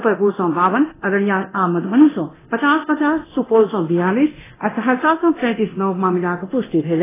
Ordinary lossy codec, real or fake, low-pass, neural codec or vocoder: AAC, 16 kbps; fake; 3.6 kHz; codec, 24 kHz, 0.9 kbps, DualCodec